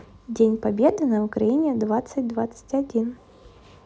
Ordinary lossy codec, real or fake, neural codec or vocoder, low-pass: none; real; none; none